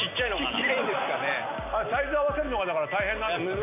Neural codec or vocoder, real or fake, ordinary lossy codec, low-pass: none; real; AAC, 32 kbps; 3.6 kHz